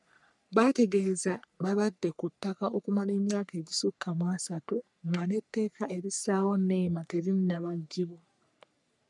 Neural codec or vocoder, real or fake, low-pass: codec, 44.1 kHz, 3.4 kbps, Pupu-Codec; fake; 10.8 kHz